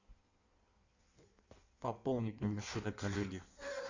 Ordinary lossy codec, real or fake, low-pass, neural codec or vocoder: Opus, 64 kbps; fake; 7.2 kHz; codec, 16 kHz in and 24 kHz out, 1.1 kbps, FireRedTTS-2 codec